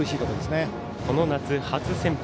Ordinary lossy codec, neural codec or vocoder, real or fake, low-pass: none; none; real; none